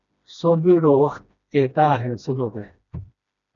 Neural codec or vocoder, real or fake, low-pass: codec, 16 kHz, 1 kbps, FreqCodec, smaller model; fake; 7.2 kHz